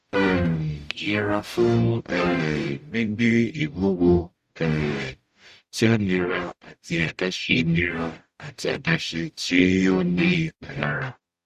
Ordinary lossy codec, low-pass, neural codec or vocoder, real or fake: Opus, 64 kbps; 14.4 kHz; codec, 44.1 kHz, 0.9 kbps, DAC; fake